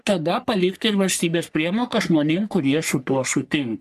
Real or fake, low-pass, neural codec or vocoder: fake; 14.4 kHz; codec, 44.1 kHz, 3.4 kbps, Pupu-Codec